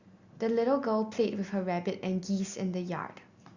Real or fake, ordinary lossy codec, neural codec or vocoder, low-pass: real; Opus, 64 kbps; none; 7.2 kHz